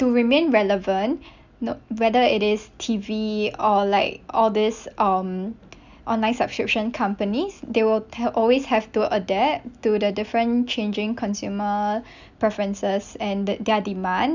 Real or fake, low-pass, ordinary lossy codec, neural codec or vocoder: real; 7.2 kHz; none; none